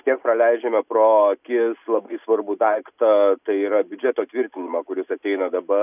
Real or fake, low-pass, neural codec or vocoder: real; 3.6 kHz; none